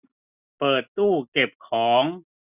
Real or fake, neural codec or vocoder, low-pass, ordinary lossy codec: real; none; 3.6 kHz; none